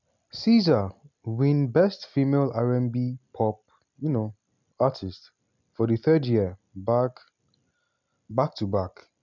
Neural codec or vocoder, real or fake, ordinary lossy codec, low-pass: none; real; none; 7.2 kHz